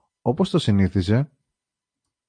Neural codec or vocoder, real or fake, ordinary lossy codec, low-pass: none; real; AAC, 64 kbps; 9.9 kHz